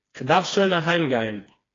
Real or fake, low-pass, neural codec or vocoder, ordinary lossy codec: fake; 7.2 kHz; codec, 16 kHz, 2 kbps, FreqCodec, smaller model; AAC, 32 kbps